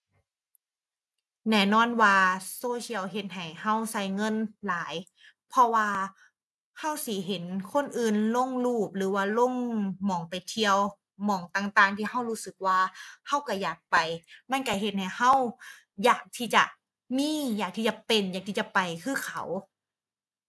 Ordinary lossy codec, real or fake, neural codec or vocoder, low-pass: none; real; none; none